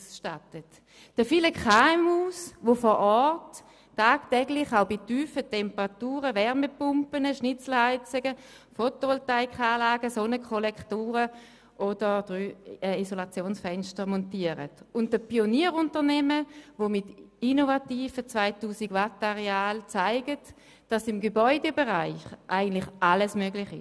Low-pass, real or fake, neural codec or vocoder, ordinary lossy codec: none; real; none; none